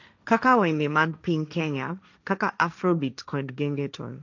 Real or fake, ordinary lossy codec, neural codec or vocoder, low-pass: fake; none; codec, 16 kHz, 1.1 kbps, Voila-Tokenizer; 7.2 kHz